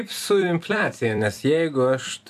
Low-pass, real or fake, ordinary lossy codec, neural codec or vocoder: 14.4 kHz; fake; AAC, 96 kbps; vocoder, 44.1 kHz, 128 mel bands every 256 samples, BigVGAN v2